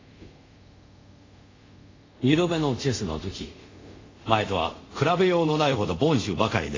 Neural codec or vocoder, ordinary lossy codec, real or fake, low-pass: codec, 24 kHz, 0.5 kbps, DualCodec; AAC, 32 kbps; fake; 7.2 kHz